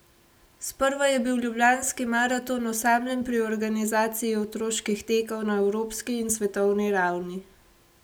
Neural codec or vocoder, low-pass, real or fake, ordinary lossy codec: none; none; real; none